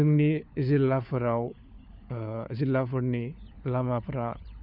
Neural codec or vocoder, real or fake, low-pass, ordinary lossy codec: codec, 16 kHz, 4 kbps, FunCodec, trained on LibriTTS, 50 frames a second; fake; 5.4 kHz; none